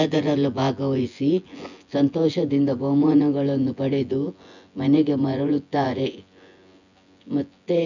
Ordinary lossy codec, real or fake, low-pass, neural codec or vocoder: none; fake; 7.2 kHz; vocoder, 24 kHz, 100 mel bands, Vocos